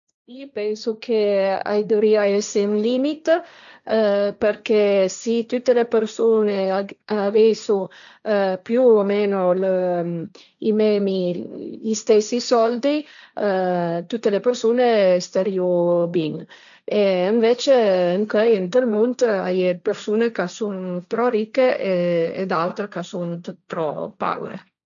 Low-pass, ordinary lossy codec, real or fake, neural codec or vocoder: 7.2 kHz; none; fake; codec, 16 kHz, 1.1 kbps, Voila-Tokenizer